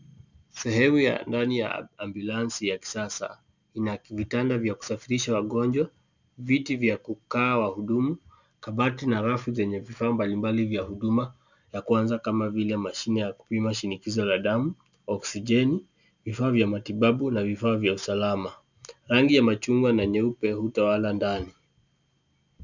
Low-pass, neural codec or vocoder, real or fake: 7.2 kHz; none; real